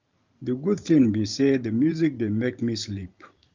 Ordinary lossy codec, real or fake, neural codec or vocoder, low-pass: Opus, 24 kbps; fake; vocoder, 44.1 kHz, 128 mel bands every 512 samples, BigVGAN v2; 7.2 kHz